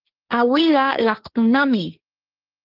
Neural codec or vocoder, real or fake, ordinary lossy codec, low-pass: codec, 16 kHz, 1.1 kbps, Voila-Tokenizer; fake; Opus, 32 kbps; 5.4 kHz